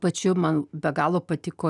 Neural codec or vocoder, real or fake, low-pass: none; real; 10.8 kHz